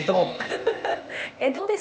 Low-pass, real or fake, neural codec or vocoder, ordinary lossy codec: none; fake; codec, 16 kHz, 0.8 kbps, ZipCodec; none